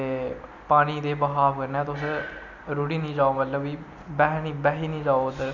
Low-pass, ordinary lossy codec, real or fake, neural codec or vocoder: 7.2 kHz; none; real; none